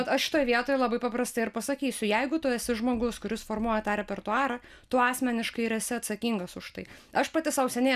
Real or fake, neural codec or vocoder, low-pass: real; none; 14.4 kHz